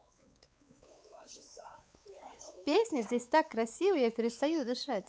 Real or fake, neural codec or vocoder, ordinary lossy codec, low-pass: fake; codec, 16 kHz, 4 kbps, X-Codec, WavLM features, trained on Multilingual LibriSpeech; none; none